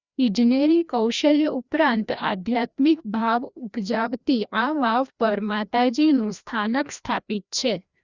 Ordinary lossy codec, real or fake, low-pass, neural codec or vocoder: Opus, 64 kbps; fake; 7.2 kHz; codec, 16 kHz, 1 kbps, FreqCodec, larger model